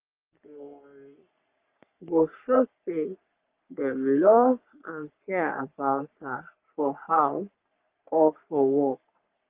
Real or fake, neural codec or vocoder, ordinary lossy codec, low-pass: fake; codec, 44.1 kHz, 3.4 kbps, Pupu-Codec; Opus, 32 kbps; 3.6 kHz